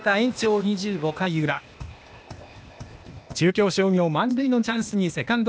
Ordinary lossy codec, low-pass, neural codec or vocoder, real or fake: none; none; codec, 16 kHz, 0.8 kbps, ZipCodec; fake